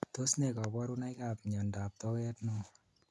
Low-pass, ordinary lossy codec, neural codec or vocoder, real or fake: none; none; none; real